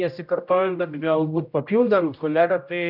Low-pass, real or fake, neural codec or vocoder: 5.4 kHz; fake; codec, 16 kHz, 0.5 kbps, X-Codec, HuBERT features, trained on general audio